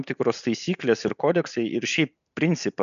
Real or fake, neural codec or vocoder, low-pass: real; none; 7.2 kHz